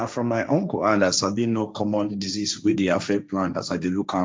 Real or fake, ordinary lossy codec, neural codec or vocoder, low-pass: fake; none; codec, 16 kHz, 1.1 kbps, Voila-Tokenizer; none